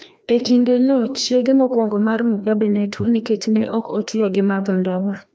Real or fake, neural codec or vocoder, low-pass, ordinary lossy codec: fake; codec, 16 kHz, 1 kbps, FreqCodec, larger model; none; none